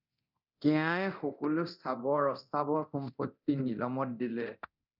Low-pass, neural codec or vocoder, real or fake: 5.4 kHz; codec, 24 kHz, 0.9 kbps, DualCodec; fake